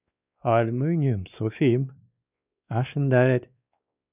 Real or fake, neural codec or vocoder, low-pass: fake; codec, 16 kHz, 2 kbps, X-Codec, WavLM features, trained on Multilingual LibriSpeech; 3.6 kHz